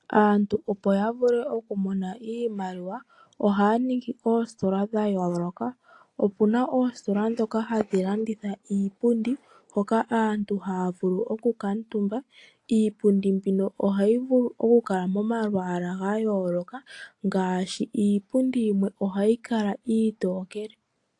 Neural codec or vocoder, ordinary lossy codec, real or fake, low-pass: none; AAC, 48 kbps; real; 10.8 kHz